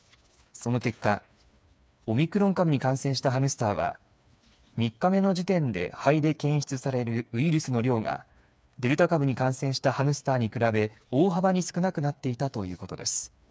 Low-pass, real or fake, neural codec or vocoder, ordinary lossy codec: none; fake; codec, 16 kHz, 4 kbps, FreqCodec, smaller model; none